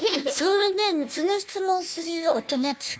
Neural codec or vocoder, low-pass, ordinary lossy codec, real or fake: codec, 16 kHz, 1 kbps, FunCodec, trained on Chinese and English, 50 frames a second; none; none; fake